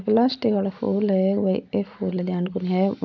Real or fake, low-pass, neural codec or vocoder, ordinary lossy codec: real; 7.2 kHz; none; none